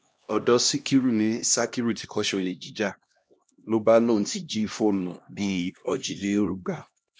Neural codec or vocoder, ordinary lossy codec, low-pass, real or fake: codec, 16 kHz, 1 kbps, X-Codec, HuBERT features, trained on LibriSpeech; none; none; fake